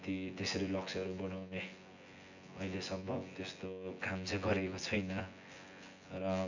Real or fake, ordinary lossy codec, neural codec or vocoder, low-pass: fake; none; vocoder, 24 kHz, 100 mel bands, Vocos; 7.2 kHz